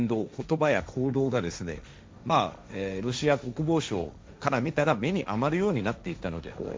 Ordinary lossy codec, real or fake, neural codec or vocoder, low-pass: none; fake; codec, 16 kHz, 1.1 kbps, Voila-Tokenizer; none